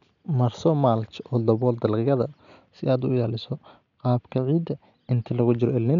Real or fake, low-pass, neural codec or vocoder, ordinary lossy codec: real; 7.2 kHz; none; none